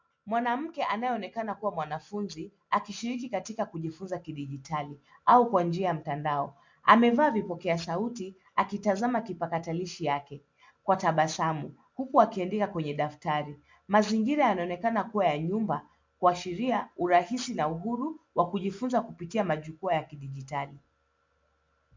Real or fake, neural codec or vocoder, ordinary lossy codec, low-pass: real; none; MP3, 64 kbps; 7.2 kHz